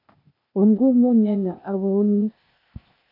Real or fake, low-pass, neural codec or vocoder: fake; 5.4 kHz; codec, 16 kHz, 0.8 kbps, ZipCodec